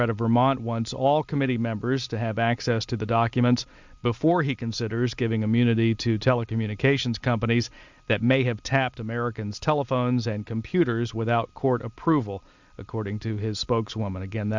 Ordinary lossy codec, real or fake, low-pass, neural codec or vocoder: Opus, 64 kbps; real; 7.2 kHz; none